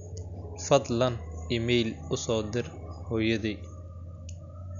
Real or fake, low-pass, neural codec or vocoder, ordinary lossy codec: real; 7.2 kHz; none; none